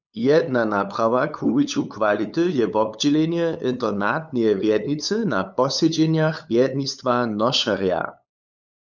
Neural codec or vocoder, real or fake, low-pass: codec, 16 kHz, 8 kbps, FunCodec, trained on LibriTTS, 25 frames a second; fake; 7.2 kHz